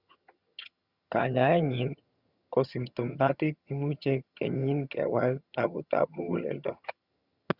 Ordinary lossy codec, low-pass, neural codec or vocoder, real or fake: Opus, 64 kbps; 5.4 kHz; vocoder, 22.05 kHz, 80 mel bands, HiFi-GAN; fake